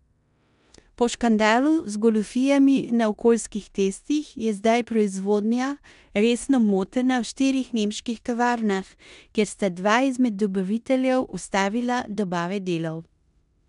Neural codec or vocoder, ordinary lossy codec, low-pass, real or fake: codec, 16 kHz in and 24 kHz out, 0.9 kbps, LongCat-Audio-Codec, four codebook decoder; MP3, 96 kbps; 10.8 kHz; fake